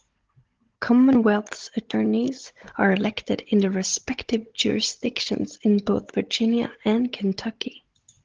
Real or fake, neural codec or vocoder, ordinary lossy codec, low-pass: fake; codec, 16 kHz, 16 kbps, FunCodec, trained on Chinese and English, 50 frames a second; Opus, 16 kbps; 7.2 kHz